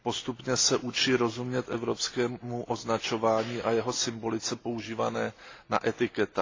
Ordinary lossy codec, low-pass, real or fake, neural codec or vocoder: AAC, 32 kbps; 7.2 kHz; real; none